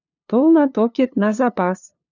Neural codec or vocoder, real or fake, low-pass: codec, 16 kHz, 2 kbps, FunCodec, trained on LibriTTS, 25 frames a second; fake; 7.2 kHz